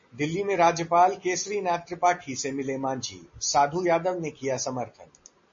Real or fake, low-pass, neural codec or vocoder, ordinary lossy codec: real; 7.2 kHz; none; MP3, 32 kbps